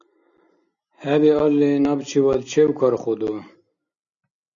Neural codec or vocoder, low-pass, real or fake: none; 7.2 kHz; real